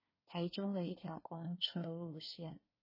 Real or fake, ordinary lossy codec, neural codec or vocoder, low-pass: fake; MP3, 24 kbps; codec, 24 kHz, 1 kbps, SNAC; 5.4 kHz